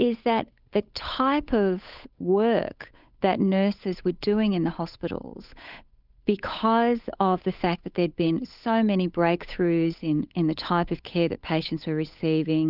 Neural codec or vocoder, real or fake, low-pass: codec, 16 kHz, 8 kbps, FunCodec, trained on Chinese and English, 25 frames a second; fake; 5.4 kHz